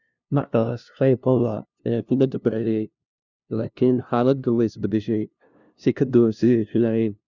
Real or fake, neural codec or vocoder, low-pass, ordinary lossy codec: fake; codec, 16 kHz, 0.5 kbps, FunCodec, trained on LibriTTS, 25 frames a second; 7.2 kHz; none